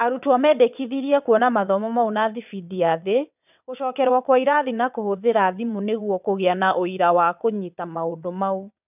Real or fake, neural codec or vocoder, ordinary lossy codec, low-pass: fake; vocoder, 22.05 kHz, 80 mel bands, WaveNeXt; none; 3.6 kHz